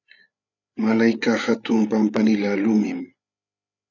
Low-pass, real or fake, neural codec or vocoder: 7.2 kHz; fake; codec, 16 kHz, 8 kbps, FreqCodec, larger model